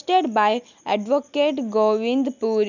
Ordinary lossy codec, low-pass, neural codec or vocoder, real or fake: none; 7.2 kHz; none; real